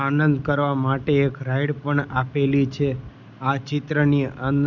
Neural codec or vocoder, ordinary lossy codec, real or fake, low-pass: none; none; real; 7.2 kHz